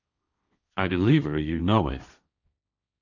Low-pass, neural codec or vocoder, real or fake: 7.2 kHz; codec, 16 kHz, 1.1 kbps, Voila-Tokenizer; fake